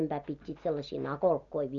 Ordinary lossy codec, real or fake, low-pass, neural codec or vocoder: none; real; 7.2 kHz; none